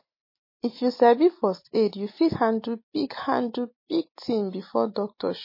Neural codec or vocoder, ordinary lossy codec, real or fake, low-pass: none; MP3, 24 kbps; real; 5.4 kHz